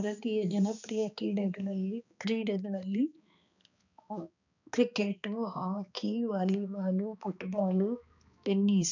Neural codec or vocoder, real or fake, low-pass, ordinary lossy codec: codec, 16 kHz, 2 kbps, X-Codec, HuBERT features, trained on balanced general audio; fake; 7.2 kHz; none